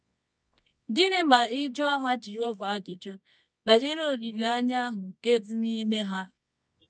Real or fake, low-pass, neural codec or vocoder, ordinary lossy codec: fake; 9.9 kHz; codec, 24 kHz, 0.9 kbps, WavTokenizer, medium music audio release; MP3, 96 kbps